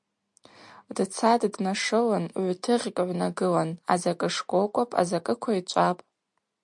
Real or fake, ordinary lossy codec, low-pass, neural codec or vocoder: real; MP3, 64 kbps; 10.8 kHz; none